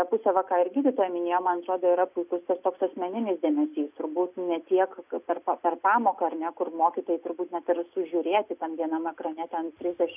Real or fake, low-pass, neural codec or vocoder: real; 3.6 kHz; none